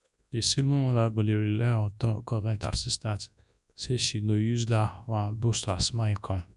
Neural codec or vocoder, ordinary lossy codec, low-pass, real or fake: codec, 24 kHz, 0.9 kbps, WavTokenizer, large speech release; none; 10.8 kHz; fake